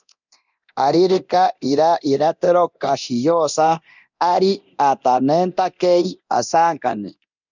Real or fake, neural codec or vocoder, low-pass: fake; codec, 24 kHz, 0.9 kbps, DualCodec; 7.2 kHz